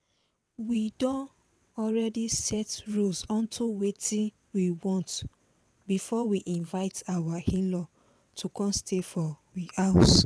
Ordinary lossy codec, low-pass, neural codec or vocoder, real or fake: none; none; vocoder, 22.05 kHz, 80 mel bands, WaveNeXt; fake